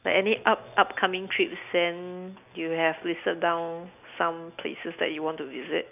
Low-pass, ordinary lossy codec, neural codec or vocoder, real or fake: 3.6 kHz; none; none; real